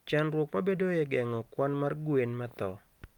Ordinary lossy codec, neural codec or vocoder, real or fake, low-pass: Opus, 32 kbps; none; real; 19.8 kHz